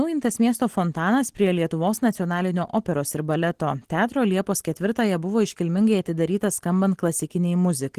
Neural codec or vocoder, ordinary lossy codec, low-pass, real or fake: none; Opus, 16 kbps; 14.4 kHz; real